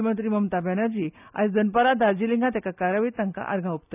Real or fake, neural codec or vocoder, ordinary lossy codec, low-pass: real; none; none; 3.6 kHz